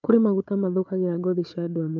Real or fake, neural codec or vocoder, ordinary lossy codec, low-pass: fake; codec, 16 kHz, 4 kbps, FunCodec, trained on Chinese and English, 50 frames a second; none; 7.2 kHz